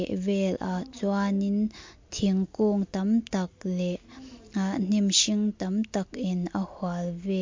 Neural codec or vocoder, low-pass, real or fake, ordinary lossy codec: none; 7.2 kHz; real; MP3, 48 kbps